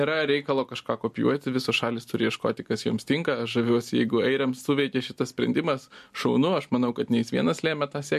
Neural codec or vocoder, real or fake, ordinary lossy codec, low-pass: none; real; MP3, 64 kbps; 14.4 kHz